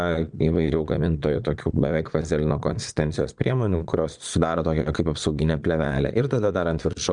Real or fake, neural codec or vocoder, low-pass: fake; vocoder, 22.05 kHz, 80 mel bands, Vocos; 9.9 kHz